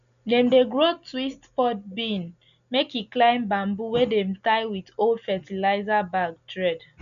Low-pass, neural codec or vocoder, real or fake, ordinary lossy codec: 7.2 kHz; none; real; none